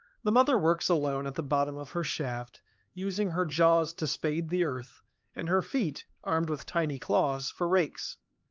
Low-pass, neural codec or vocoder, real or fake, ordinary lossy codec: 7.2 kHz; codec, 16 kHz, 4 kbps, X-Codec, HuBERT features, trained on LibriSpeech; fake; Opus, 32 kbps